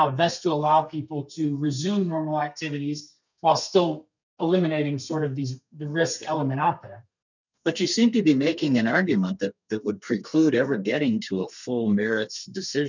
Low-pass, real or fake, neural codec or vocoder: 7.2 kHz; fake; codec, 32 kHz, 1.9 kbps, SNAC